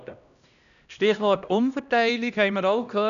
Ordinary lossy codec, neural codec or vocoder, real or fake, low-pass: none; codec, 16 kHz, 1 kbps, X-Codec, HuBERT features, trained on LibriSpeech; fake; 7.2 kHz